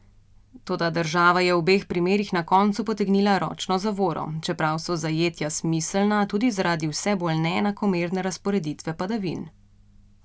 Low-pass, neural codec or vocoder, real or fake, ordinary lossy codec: none; none; real; none